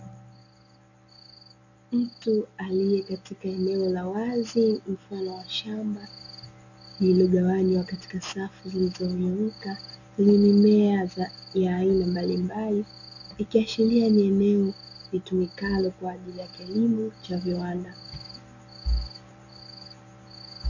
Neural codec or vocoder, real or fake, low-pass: none; real; 7.2 kHz